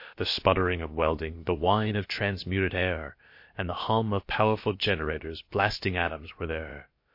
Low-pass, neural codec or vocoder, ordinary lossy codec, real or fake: 5.4 kHz; codec, 16 kHz, about 1 kbps, DyCAST, with the encoder's durations; MP3, 32 kbps; fake